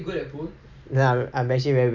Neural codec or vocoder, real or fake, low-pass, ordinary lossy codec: none; real; 7.2 kHz; none